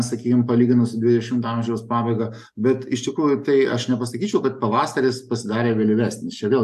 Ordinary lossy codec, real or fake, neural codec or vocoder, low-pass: AAC, 96 kbps; real; none; 14.4 kHz